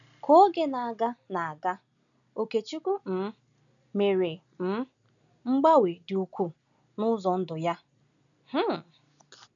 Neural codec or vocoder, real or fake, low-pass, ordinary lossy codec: none; real; 7.2 kHz; none